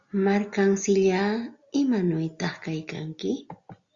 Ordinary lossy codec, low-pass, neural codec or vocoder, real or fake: Opus, 64 kbps; 7.2 kHz; none; real